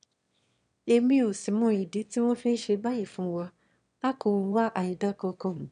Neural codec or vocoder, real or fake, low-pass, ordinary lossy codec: autoencoder, 22.05 kHz, a latent of 192 numbers a frame, VITS, trained on one speaker; fake; 9.9 kHz; none